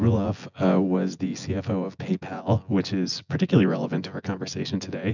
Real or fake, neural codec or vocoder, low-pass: fake; vocoder, 24 kHz, 100 mel bands, Vocos; 7.2 kHz